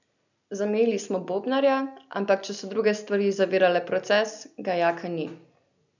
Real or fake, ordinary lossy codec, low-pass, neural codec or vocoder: real; none; 7.2 kHz; none